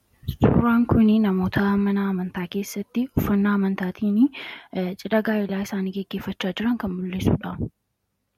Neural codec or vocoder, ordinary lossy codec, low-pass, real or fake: none; MP3, 64 kbps; 19.8 kHz; real